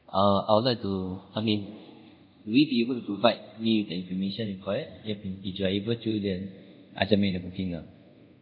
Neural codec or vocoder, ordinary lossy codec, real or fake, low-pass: codec, 24 kHz, 0.5 kbps, DualCodec; none; fake; 5.4 kHz